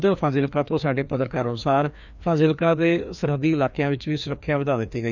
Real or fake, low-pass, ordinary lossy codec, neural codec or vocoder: fake; 7.2 kHz; none; codec, 16 kHz, 2 kbps, FreqCodec, larger model